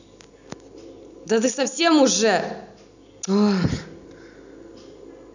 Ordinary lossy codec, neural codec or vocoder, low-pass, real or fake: none; none; 7.2 kHz; real